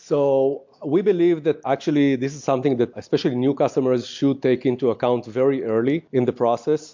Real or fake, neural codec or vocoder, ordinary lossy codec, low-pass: real; none; MP3, 48 kbps; 7.2 kHz